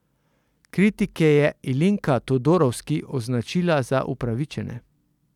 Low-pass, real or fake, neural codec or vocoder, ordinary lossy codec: 19.8 kHz; real; none; none